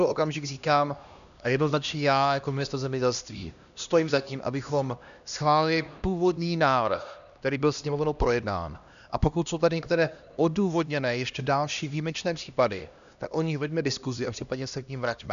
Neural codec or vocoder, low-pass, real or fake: codec, 16 kHz, 1 kbps, X-Codec, HuBERT features, trained on LibriSpeech; 7.2 kHz; fake